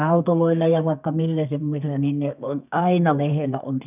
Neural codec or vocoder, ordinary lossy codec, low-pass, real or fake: codec, 32 kHz, 1.9 kbps, SNAC; none; 3.6 kHz; fake